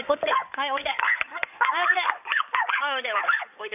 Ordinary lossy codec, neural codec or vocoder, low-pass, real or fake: none; codec, 16 kHz in and 24 kHz out, 2.2 kbps, FireRedTTS-2 codec; 3.6 kHz; fake